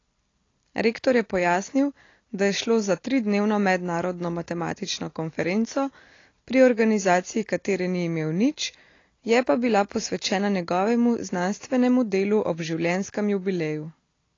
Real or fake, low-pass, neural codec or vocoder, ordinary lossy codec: real; 7.2 kHz; none; AAC, 32 kbps